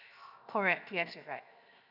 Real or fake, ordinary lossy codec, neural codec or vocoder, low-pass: fake; none; codec, 16 kHz, 0.7 kbps, FocalCodec; 5.4 kHz